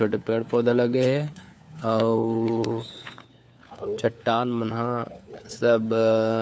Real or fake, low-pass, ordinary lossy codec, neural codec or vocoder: fake; none; none; codec, 16 kHz, 4 kbps, FunCodec, trained on LibriTTS, 50 frames a second